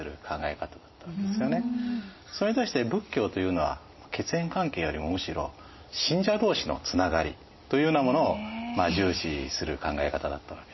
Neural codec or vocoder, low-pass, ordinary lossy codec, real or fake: none; 7.2 kHz; MP3, 24 kbps; real